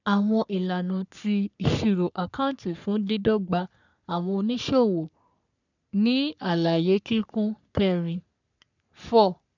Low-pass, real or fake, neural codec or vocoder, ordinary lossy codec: 7.2 kHz; fake; codec, 44.1 kHz, 3.4 kbps, Pupu-Codec; none